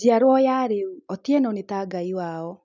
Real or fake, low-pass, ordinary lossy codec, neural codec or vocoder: real; 7.2 kHz; none; none